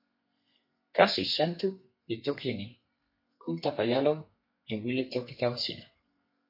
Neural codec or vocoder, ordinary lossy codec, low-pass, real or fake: codec, 32 kHz, 1.9 kbps, SNAC; MP3, 32 kbps; 5.4 kHz; fake